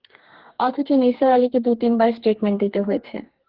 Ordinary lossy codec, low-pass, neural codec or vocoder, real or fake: Opus, 16 kbps; 5.4 kHz; codec, 32 kHz, 1.9 kbps, SNAC; fake